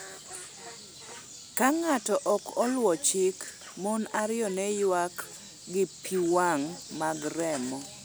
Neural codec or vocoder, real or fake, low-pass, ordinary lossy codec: none; real; none; none